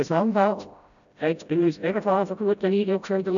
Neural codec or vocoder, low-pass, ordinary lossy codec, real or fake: codec, 16 kHz, 0.5 kbps, FreqCodec, smaller model; 7.2 kHz; none; fake